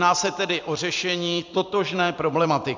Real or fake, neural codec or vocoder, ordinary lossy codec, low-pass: fake; vocoder, 44.1 kHz, 128 mel bands every 256 samples, BigVGAN v2; MP3, 64 kbps; 7.2 kHz